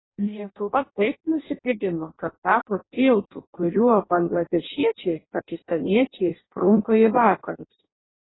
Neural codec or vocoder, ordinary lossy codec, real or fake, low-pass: codec, 16 kHz in and 24 kHz out, 0.6 kbps, FireRedTTS-2 codec; AAC, 16 kbps; fake; 7.2 kHz